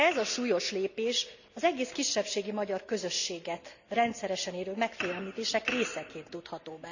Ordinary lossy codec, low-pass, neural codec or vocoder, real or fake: none; 7.2 kHz; none; real